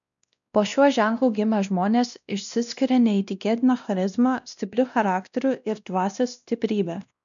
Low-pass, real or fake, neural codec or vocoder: 7.2 kHz; fake; codec, 16 kHz, 1 kbps, X-Codec, WavLM features, trained on Multilingual LibriSpeech